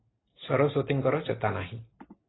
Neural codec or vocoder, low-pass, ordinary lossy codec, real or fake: none; 7.2 kHz; AAC, 16 kbps; real